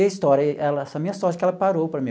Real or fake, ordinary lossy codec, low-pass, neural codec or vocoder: real; none; none; none